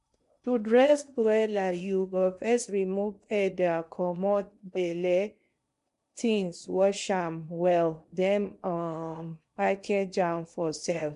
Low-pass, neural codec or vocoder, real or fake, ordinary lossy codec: 10.8 kHz; codec, 16 kHz in and 24 kHz out, 0.8 kbps, FocalCodec, streaming, 65536 codes; fake; MP3, 64 kbps